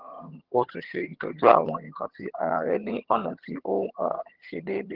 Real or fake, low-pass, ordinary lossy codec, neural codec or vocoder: fake; 5.4 kHz; Opus, 16 kbps; vocoder, 22.05 kHz, 80 mel bands, HiFi-GAN